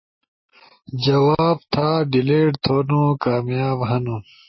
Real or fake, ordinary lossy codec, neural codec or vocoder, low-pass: real; MP3, 24 kbps; none; 7.2 kHz